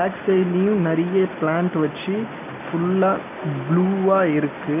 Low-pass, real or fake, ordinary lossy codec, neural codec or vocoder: 3.6 kHz; real; none; none